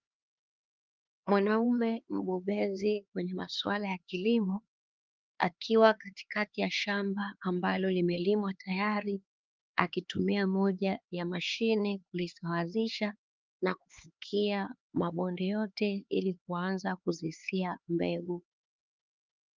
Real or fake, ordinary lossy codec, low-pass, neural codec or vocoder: fake; Opus, 32 kbps; 7.2 kHz; codec, 16 kHz, 4 kbps, X-Codec, HuBERT features, trained on LibriSpeech